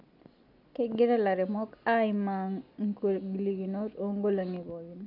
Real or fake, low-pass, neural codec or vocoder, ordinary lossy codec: real; 5.4 kHz; none; none